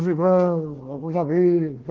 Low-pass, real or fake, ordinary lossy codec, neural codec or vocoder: 7.2 kHz; fake; Opus, 16 kbps; codec, 16 kHz, 1 kbps, FunCodec, trained on Chinese and English, 50 frames a second